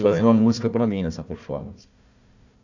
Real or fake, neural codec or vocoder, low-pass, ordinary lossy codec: fake; codec, 16 kHz, 1 kbps, FunCodec, trained on Chinese and English, 50 frames a second; 7.2 kHz; none